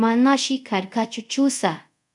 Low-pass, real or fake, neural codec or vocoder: 10.8 kHz; fake; codec, 24 kHz, 0.5 kbps, DualCodec